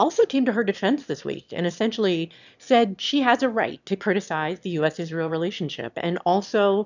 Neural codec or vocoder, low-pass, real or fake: autoencoder, 22.05 kHz, a latent of 192 numbers a frame, VITS, trained on one speaker; 7.2 kHz; fake